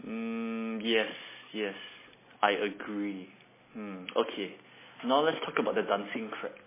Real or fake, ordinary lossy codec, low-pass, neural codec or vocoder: real; MP3, 16 kbps; 3.6 kHz; none